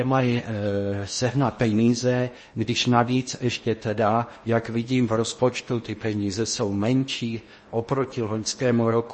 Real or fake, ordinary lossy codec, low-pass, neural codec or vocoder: fake; MP3, 32 kbps; 10.8 kHz; codec, 16 kHz in and 24 kHz out, 0.8 kbps, FocalCodec, streaming, 65536 codes